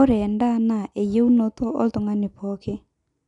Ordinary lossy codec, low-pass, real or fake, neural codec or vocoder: none; 10.8 kHz; real; none